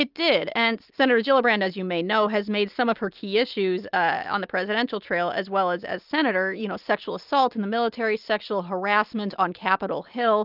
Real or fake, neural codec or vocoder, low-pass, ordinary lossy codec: real; none; 5.4 kHz; Opus, 24 kbps